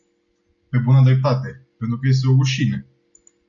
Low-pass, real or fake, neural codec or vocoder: 7.2 kHz; real; none